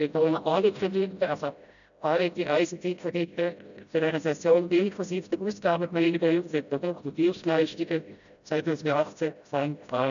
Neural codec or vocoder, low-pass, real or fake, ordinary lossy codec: codec, 16 kHz, 0.5 kbps, FreqCodec, smaller model; 7.2 kHz; fake; none